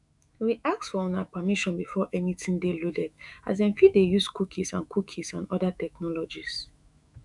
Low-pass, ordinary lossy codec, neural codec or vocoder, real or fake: 10.8 kHz; none; autoencoder, 48 kHz, 128 numbers a frame, DAC-VAE, trained on Japanese speech; fake